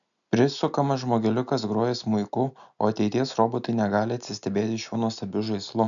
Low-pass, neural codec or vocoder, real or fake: 7.2 kHz; none; real